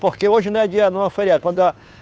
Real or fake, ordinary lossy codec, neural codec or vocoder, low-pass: fake; none; codec, 16 kHz, 2 kbps, FunCodec, trained on Chinese and English, 25 frames a second; none